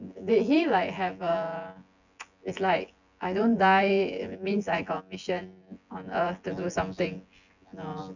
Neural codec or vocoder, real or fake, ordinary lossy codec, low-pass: vocoder, 24 kHz, 100 mel bands, Vocos; fake; none; 7.2 kHz